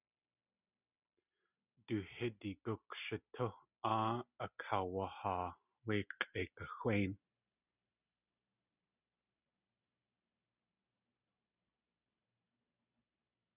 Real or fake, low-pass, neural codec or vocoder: real; 3.6 kHz; none